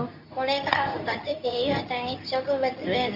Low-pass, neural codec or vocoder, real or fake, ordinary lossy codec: 5.4 kHz; codec, 24 kHz, 0.9 kbps, WavTokenizer, medium speech release version 2; fake; none